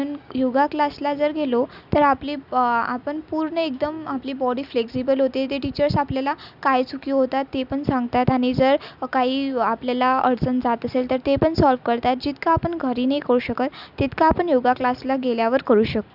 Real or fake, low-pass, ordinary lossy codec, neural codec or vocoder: real; 5.4 kHz; none; none